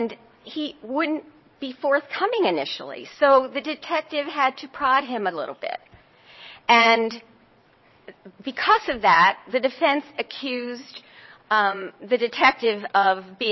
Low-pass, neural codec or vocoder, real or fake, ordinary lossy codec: 7.2 kHz; vocoder, 22.05 kHz, 80 mel bands, WaveNeXt; fake; MP3, 24 kbps